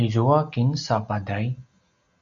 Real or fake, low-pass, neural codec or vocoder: real; 7.2 kHz; none